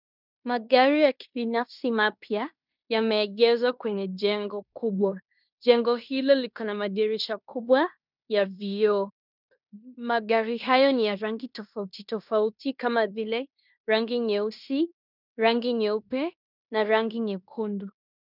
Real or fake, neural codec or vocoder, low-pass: fake; codec, 16 kHz in and 24 kHz out, 0.9 kbps, LongCat-Audio-Codec, fine tuned four codebook decoder; 5.4 kHz